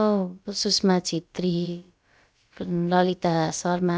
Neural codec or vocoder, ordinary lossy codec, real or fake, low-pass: codec, 16 kHz, about 1 kbps, DyCAST, with the encoder's durations; none; fake; none